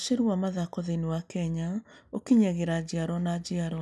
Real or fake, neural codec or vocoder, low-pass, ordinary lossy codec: real; none; none; none